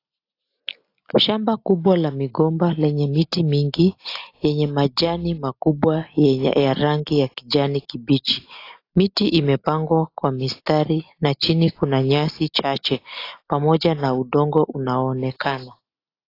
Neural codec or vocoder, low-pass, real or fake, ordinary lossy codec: none; 5.4 kHz; real; AAC, 32 kbps